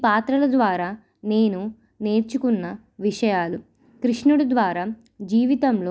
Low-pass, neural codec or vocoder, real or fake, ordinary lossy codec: none; none; real; none